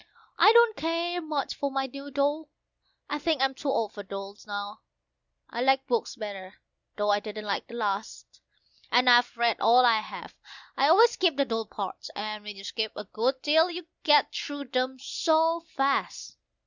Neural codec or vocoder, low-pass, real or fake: none; 7.2 kHz; real